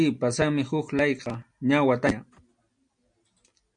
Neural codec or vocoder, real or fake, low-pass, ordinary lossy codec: none; real; 9.9 kHz; MP3, 64 kbps